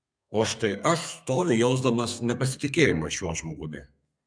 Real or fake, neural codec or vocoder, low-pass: fake; codec, 32 kHz, 1.9 kbps, SNAC; 9.9 kHz